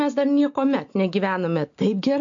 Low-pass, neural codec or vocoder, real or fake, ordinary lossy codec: 7.2 kHz; none; real; MP3, 48 kbps